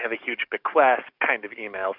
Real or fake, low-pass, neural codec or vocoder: fake; 5.4 kHz; codec, 44.1 kHz, 7.8 kbps, DAC